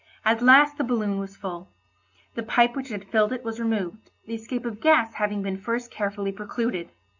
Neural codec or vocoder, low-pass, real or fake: none; 7.2 kHz; real